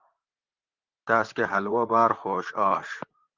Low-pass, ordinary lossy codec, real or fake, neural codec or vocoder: 7.2 kHz; Opus, 32 kbps; fake; vocoder, 44.1 kHz, 128 mel bands, Pupu-Vocoder